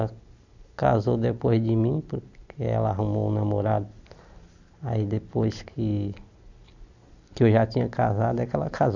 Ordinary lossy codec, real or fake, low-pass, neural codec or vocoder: none; real; 7.2 kHz; none